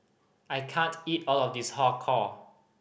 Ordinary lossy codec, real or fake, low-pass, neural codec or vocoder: none; real; none; none